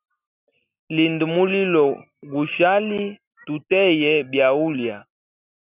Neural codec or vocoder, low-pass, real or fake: none; 3.6 kHz; real